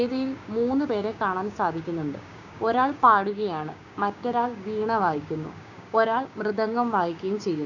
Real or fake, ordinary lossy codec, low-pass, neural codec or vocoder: fake; none; 7.2 kHz; codec, 16 kHz, 6 kbps, DAC